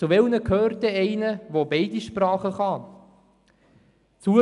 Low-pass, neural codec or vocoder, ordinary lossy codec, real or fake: 10.8 kHz; none; none; real